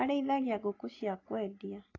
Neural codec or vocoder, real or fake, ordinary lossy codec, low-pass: none; real; AAC, 32 kbps; 7.2 kHz